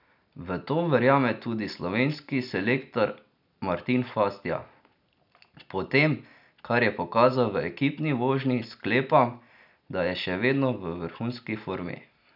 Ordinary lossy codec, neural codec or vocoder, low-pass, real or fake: none; none; 5.4 kHz; real